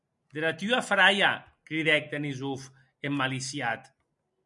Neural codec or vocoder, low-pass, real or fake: none; 10.8 kHz; real